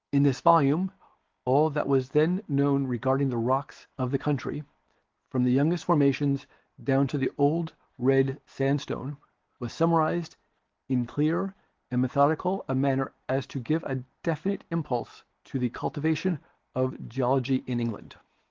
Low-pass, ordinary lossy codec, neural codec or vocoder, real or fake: 7.2 kHz; Opus, 16 kbps; none; real